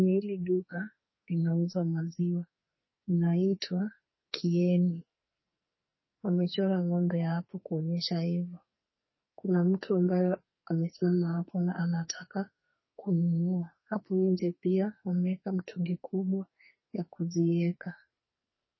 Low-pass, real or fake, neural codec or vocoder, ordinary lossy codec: 7.2 kHz; fake; codec, 44.1 kHz, 2.6 kbps, SNAC; MP3, 24 kbps